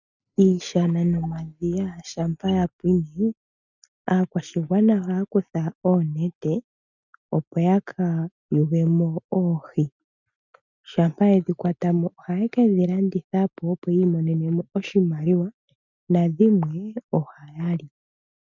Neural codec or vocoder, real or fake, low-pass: none; real; 7.2 kHz